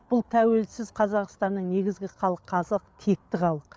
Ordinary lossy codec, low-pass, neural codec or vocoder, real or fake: none; none; codec, 16 kHz, 8 kbps, FreqCodec, larger model; fake